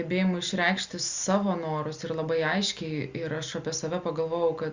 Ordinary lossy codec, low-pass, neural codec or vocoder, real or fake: Opus, 64 kbps; 7.2 kHz; none; real